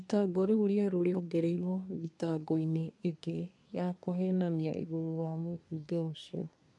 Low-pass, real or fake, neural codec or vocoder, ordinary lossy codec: 10.8 kHz; fake; codec, 24 kHz, 1 kbps, SNAC; none